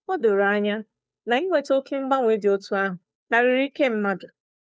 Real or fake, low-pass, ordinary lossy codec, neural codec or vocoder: fake; none; none; codec, 16 kHz, 2 kbps, FunCodec, trained on Chinese and English, 25 frames a second